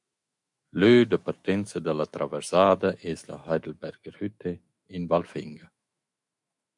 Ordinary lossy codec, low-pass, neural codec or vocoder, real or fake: MP3, 64 kbps; 10.8 kHz; autoencoder, 48 kHz, 128 numbers a frame, DAC-VAE, trained on Japanese speech; fake